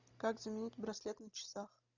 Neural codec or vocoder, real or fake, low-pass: none; real; 7.2 kHz